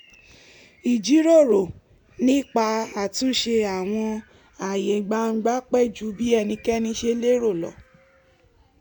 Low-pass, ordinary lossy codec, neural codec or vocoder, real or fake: 19.8 kHz; none; none; real